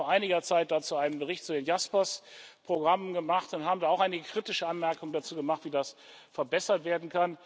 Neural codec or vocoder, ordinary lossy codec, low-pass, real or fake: none; none; none; real